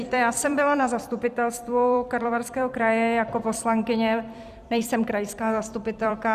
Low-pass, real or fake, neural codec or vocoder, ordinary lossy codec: 14.4 kHz; fake; vocoder, 44.1 kHz, 128 mel bands every 256 samples, BigVGAN v2; Opus, 64 kbps